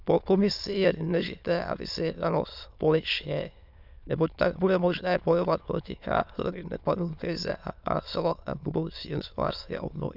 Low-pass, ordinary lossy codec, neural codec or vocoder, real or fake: 5.4 kHz; none; autoencoder, 22.05 kHz, a latent of 192 numbers a frame, VITS, trained on many speakers; fake